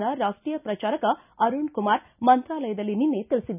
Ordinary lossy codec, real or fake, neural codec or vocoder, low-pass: none; real; none; 3.6 kHz